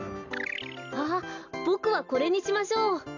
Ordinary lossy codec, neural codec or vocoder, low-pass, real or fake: none; none; 7.2 kHz; real